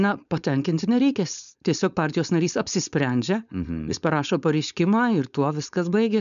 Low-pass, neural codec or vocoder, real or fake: 7.2 kHz; codec, 16 kHz, 4.8 kbps, FACodec; fake